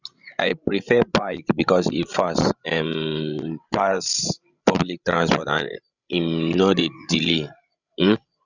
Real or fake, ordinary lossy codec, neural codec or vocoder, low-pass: fake; none; codec, 16 kHz, 16 kbps, FreqCodec, larger model; 7.2 kHz